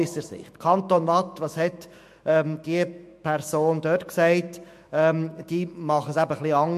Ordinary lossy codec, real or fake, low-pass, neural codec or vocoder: MP3, 64 kbps; fake; 14.4 kHz; autoencoder, 48 kHz, 128 numbers a frame, DAC-VAE, trained on Japanese speech